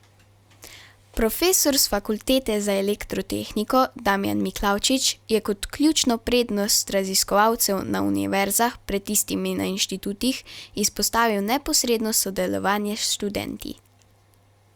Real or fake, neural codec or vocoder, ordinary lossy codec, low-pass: real; none; Opus, 64 kbps; 19.8 kHz